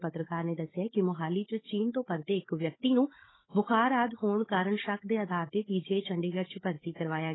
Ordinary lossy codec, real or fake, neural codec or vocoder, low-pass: AAC, 16 kbps; fake; codec, 16 kHz, 16 kbps, FunCodec, trained on Chinese and English, 50 frames a second; 7.2 kHz